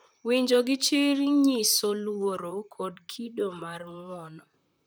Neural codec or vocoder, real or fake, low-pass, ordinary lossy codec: vocoder, 44.1 kHz, 128 mel bands, Pupu-Vocoder; fake; none; none